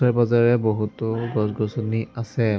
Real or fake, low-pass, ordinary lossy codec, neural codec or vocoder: real; none; none; none